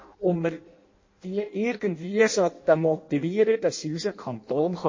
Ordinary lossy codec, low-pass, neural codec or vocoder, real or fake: MP3, 32 kbps; 7.2 kHz; codec, 16 kHz in and 24 kHz out, 0.6 kbps, FireRedTTS-2 codec; fake